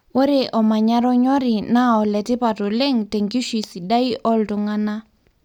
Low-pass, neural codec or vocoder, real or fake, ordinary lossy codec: 19.8 kHz; none; real; none